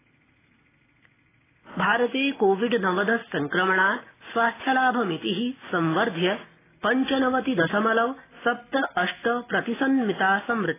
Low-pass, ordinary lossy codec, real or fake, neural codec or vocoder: 3.6 kHz; AAC, 16 kbps; real; none